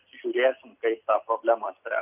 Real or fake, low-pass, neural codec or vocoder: real; 3.6 kHz; none